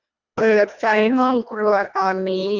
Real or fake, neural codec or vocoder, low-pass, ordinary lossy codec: fake; codec, 24 kHz, 1.5 kbps, HILCodec; 7.2 kHz; none